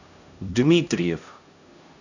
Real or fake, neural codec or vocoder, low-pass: fake; codec, 16 kHz, 1 kbps, X-Codec, HuBERT features, trained on LibriSpeech; 7.2 kHz